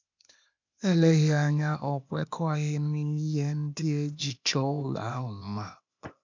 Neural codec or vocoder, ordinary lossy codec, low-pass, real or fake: codec, 16 kHz, 0.8 kbps, ZipCodec; MP3, 64 kbps; 7.2 kHz; fake